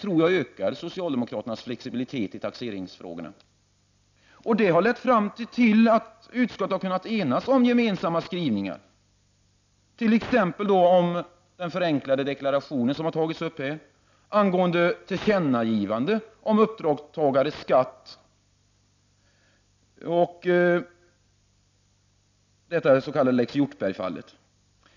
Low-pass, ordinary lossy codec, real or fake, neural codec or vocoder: 7.2 kHz; none; real; none